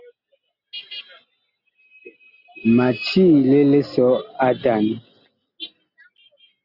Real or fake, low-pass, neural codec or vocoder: real; 5.4 kHz; none